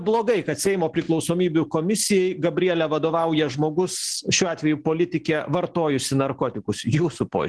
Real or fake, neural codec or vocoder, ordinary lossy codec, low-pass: real; none; Opus, 16 kbps; 10.8 kHz